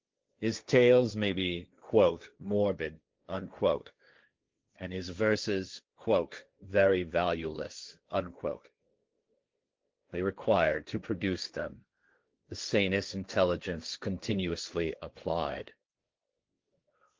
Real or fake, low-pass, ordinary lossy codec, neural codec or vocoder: fake; 7.2 kHz; Opus, 32 kbps; codec, 16 kHz, 1.1 kbps, Voila-Tokenizer